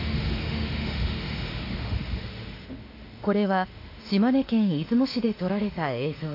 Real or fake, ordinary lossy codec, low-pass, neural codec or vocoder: fake; AAC, 32 kbps; 5.4 kHz; autoencoder, 48 kHz, 32 numbers a frame, DAC-VAE, trained on Japanese speech